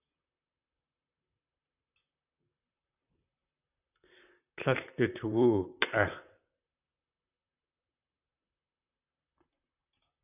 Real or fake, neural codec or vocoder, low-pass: fake; vocoder, 44.1 kHz, 128 mel bands, Pupu-Vocoder; 3.6 kHz